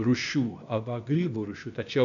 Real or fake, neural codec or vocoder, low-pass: fake; codec, 16 kHz, 0.8 kbps, ZipCodec; 7.2 kHz